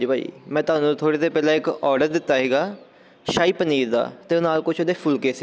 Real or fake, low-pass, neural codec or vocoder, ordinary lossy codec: real; none; none; none